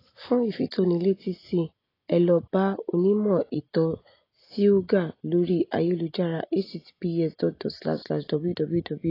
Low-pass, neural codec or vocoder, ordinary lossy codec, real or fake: 5.4 kHz; none; AAC, 24 kbps; real